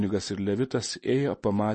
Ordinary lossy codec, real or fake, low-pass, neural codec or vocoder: MP3, 32 kbps; real; 10.8 kHz; none